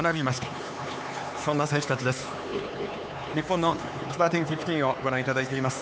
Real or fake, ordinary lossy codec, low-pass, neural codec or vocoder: fake; none; none; codec, 16 kHz, 4 kbps, X-Codec, HuBERT features, trained on LibriSpeech